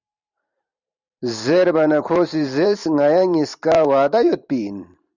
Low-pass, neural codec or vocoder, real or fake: 7.2 kHz; vocoder, 44.1 kHz, 128 mel bands every 512 samples, BigVGAN v2; fake